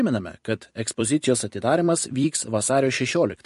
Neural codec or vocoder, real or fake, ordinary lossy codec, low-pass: vocoder, 44.1 kHz, 128 mel bands every 256 samples, BigVGAN v2; fake; MP3, 48 kbps; 14.4 kHz